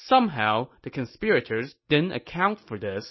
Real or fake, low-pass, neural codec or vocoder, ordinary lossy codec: real; 7.2 kHz; none; MP3, 24 kbps